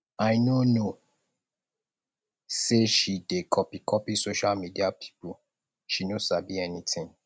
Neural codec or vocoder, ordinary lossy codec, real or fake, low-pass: none; none; real; none